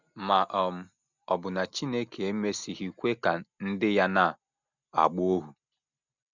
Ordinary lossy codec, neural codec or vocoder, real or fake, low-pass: none; none; real; 7.2 kHz